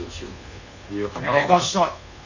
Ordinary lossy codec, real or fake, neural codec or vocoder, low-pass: none; fake; codec, 24 kHz, 1.2 kbps, DualCodec; 7.2 kHz